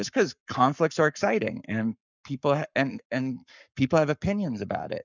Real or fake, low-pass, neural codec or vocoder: fake; 7.2 kHz; codec, 44.1 kHz, 7.8 kbps, Pupu-Codec